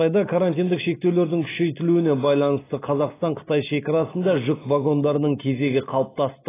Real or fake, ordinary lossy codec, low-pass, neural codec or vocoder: real; AAC, 16 kbps; 3.6 kHz; none